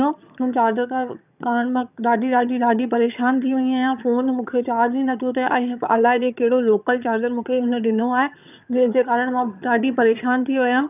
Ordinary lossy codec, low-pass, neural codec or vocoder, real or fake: none; 3.6 kHz; vocoder, 22.05 kHz, 80 mel bands, HiFi-GAN; fake